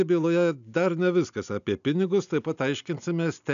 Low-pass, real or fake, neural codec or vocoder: 7.2 kHz; real; none